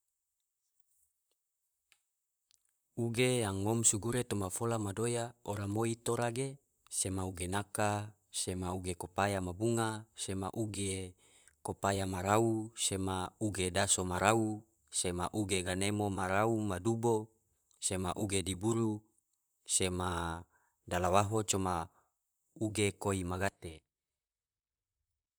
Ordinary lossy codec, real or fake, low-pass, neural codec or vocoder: none; fake; none; vocoder, 44.1 kHz, 128 mel bands, Pupu-Vocoder